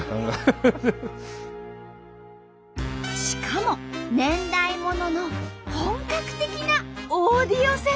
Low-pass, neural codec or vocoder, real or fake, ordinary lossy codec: none; none; real; none